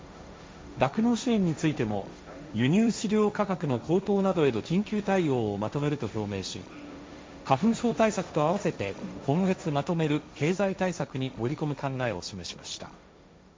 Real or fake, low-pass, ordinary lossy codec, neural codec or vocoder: fake; none; none; codec, 16 kHz, 1.1 kbps, Voila-Tokenizer